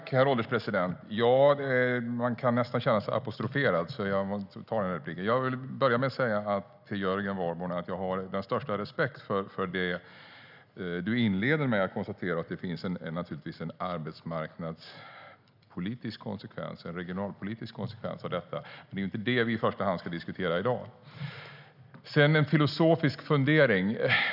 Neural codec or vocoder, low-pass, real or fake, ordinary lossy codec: none; 5.4 kHz; real; none